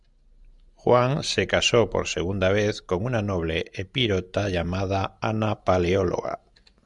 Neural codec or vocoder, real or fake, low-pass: none; real; 10.8 kHz